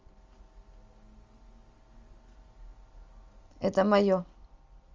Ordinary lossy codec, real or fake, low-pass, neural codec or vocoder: Opus, 32 kbps; real; 7.2 kHz; none